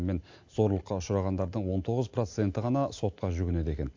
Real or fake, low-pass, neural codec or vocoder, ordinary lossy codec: real; 7.2 kHz; none; AAC, 48 kbps